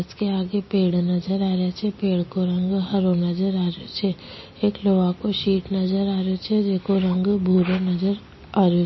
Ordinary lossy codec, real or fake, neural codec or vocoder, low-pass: MP3, 24 kbps; real; none; 7.2 kHz